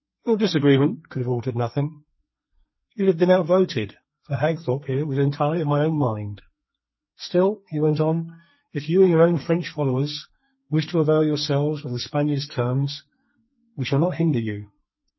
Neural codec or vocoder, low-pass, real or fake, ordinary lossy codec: codec, 32 kHz, 1.9 kbps, SNAC; 7.2 kHz; fake; MP3, 24 kbps